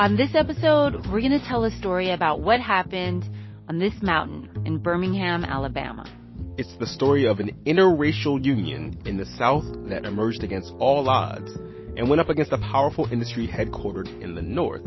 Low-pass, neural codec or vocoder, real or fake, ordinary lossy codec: 7.2 kHz; none; real; MP3, 24 kbps